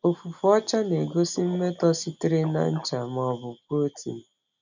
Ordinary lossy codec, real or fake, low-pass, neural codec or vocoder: none; real; 7.2 kHz; none